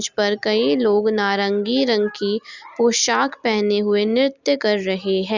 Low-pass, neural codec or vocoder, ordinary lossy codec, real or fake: 7.2 kHz; none; Opus, 64 kbps; real